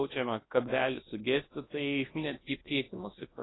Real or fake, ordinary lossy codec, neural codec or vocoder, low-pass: fake; AAC, 16 kbps; codec, 24 kHz, 0.9 kbps, WavTokenizer, large speech release; 7.2 kHz